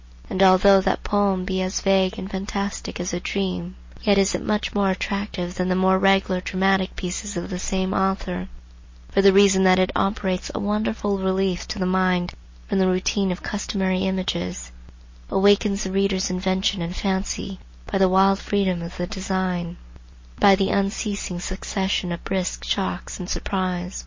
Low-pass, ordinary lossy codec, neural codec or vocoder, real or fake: 7.2 kHz; MP3, 32 kbps; none; real